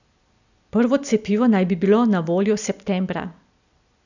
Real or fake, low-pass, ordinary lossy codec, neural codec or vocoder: real; 7.2 kHz; none; none